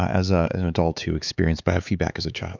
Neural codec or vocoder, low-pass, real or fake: codec, 16 kHz, 4 kbps, X-Codec, HuBERT features, trained on LibriSpeech; 7.2 kHz; fake